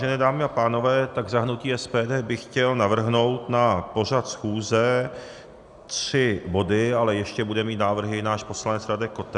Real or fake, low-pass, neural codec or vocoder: real; 10.8 kHz; none